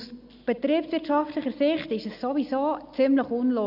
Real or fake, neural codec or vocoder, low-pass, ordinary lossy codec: real; none; 5.4 kHz; none